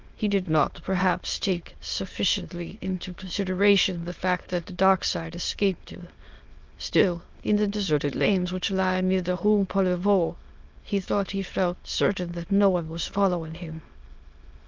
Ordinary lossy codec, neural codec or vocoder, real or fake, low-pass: Opus, 24 kbps; autoencoder, 22.05 kHz, a latent of 192 numbers a frame, VITS, trained on many speakers; fake; 7.2 kHz